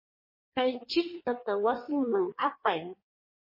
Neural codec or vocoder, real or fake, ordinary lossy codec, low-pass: codec, 16 kHz in and 24 kHz out, 1.1 kbps, FireRedTTS-2 codec; fake; MP3, 24 kbps; 5.4 kHz